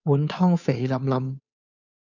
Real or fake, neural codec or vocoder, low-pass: fake; codec, 16 kHz, 2 kbps, FunCodec, trained on Chinese and English, 25 frames a second; 7.2 kHz